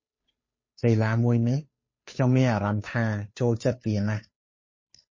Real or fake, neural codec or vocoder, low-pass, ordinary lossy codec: fake; codec, 16 kHz, 2 kbps, FunCodec, trained on Chinese and English, 25 frames a second; 7.2 kHz; MP3, 32 kbps